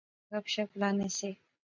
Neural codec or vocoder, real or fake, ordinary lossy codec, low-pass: none; real; MP3, 64 kbps; 7.2 kHz